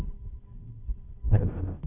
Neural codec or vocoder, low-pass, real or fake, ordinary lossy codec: codec, 16 kHz, 0.5 kbps, FunCodec, trained on Chinese and English, 25 frames a second; 3.6 kHz; fake; none